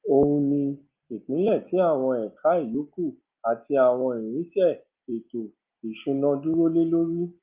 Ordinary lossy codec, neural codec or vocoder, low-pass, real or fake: Opus, 32 kbps; none; 3.6 kHz; real